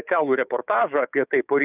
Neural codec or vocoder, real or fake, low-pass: codec, 16 kHz, 6 kbps, DAC; fake; 3.6 kHz